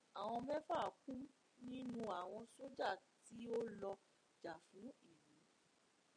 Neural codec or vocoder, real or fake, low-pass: none; real; 9.9 kHz